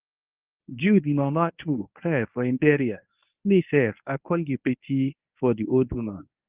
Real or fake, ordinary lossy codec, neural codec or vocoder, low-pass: fake; Opus, 32 kbps; codec, 24 kHz, 0.9 kbps, WavTokenizer, medium speech release version 1; 3.6 kHz